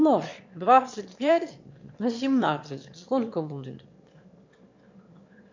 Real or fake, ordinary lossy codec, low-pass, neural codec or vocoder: fake; MP3, 48 kbps; 7.2 kHz; autoencoder, 22.05 kHz, a latent of 192 numbers a frame, VITS, trained on one speaker